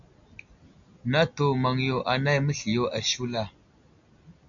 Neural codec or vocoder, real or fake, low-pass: none; real; 7.2 kHz